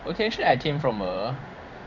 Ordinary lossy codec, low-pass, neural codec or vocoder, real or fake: none; 7.2 kHz; codec, 16 kHz in and 24 kHz out, 1 kbps, XY-Tokenizer; fake